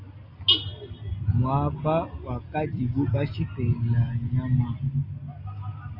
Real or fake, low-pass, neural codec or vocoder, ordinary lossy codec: real; 5.4 kHz; none; AAC, 32 kbps